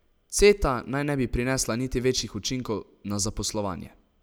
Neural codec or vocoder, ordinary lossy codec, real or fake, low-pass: none; none; real; none